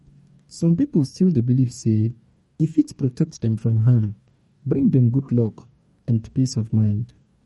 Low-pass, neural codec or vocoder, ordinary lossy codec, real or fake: 19.8 kHz; codec, 44.1 kHz, 2.6 kbps, DAC; MP3, 48 kbps; fake